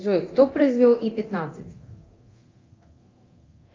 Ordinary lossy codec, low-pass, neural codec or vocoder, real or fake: Opus, 32 kbps; 7.2 kHz; codec, 24 kHz, 0.9 kbps, DualCodec; fake